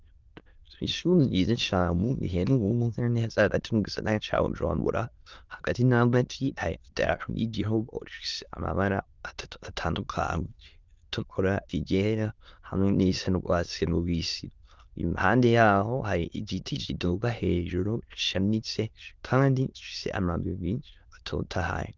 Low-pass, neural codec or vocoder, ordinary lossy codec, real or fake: 7.2 kHz; autoencoder, 22.05 kHz, a latent of 192 numbers a frame, VITS, trained on many speakers; Opus, 32 kbps; fake